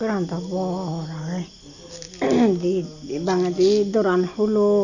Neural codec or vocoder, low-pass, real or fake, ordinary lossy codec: none; 7.2 kHz; real; none